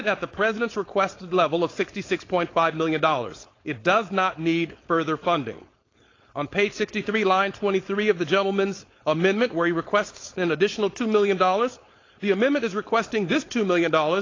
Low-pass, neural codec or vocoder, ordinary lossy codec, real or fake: 7.2 kHz; codec, 16 kHz, 4.8 kbps, FACodec; AAC, 32 kbps; fake